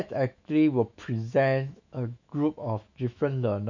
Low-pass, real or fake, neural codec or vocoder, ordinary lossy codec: 7.2 kHz; fake; autoencoder, 48 kHz, 128 numbers a frame, DAC-VAE, trained on Japanese speech; none